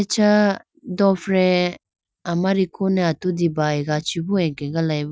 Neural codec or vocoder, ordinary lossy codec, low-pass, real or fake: none; none; none; real